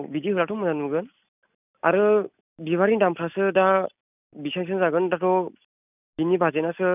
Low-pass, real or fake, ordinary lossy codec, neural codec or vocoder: 3.6 kHz; real; none; none